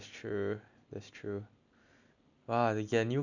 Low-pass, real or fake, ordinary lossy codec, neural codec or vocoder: 7.2 kHz; real; none; none